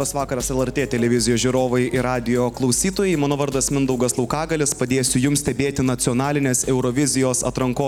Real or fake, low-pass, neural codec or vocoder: real; 19.8 kHz; none